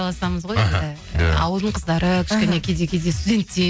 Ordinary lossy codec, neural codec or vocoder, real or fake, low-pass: none; none; real; none